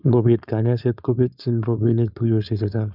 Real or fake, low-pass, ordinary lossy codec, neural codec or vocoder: fake; 5.4 kHz; none; codec, 16 kHz, 4.8 kbps, FACodec